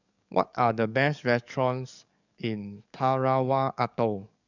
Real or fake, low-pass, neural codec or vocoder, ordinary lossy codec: fake; 7.2 kHz; codec, 44.1 kHz, 7.8 kbps, DAC; none